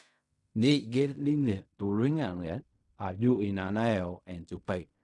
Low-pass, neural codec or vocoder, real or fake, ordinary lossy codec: 10.8 kHz; codec, 16 kHz in and 24 kHz out, 0.4 kbps, LongCat-Audio-Codec, fine tuned four codebook decoder; fake; none